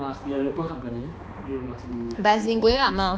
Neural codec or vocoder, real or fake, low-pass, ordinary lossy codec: codec, 16 kHz, 2 kbps, X-Codec, HuBERT features, trained on balanced general audio; fake; none; none